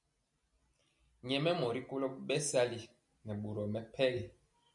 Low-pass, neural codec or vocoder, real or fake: 10.8 kHz; none; real